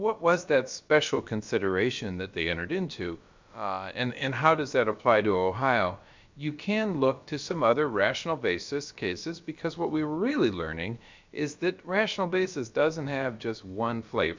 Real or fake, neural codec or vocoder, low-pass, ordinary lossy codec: fake; codec, 16 kHz, about 1 kbps, DyCAST, with the encoder's durations; 7.2 kHz; MP3, 64 kbps